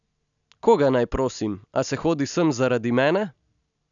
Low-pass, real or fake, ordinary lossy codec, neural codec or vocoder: 7.2 kHz; real; none; none